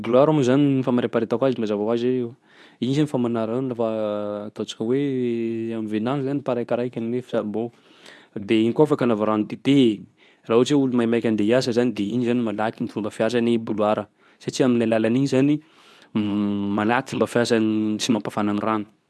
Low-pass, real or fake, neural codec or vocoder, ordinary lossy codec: none; fake; codec, 24 kHz, 0.9 kbps, WavTokenizer, medium speech release version 2; none